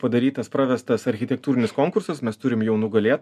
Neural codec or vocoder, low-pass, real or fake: none; 14.4 kHz; real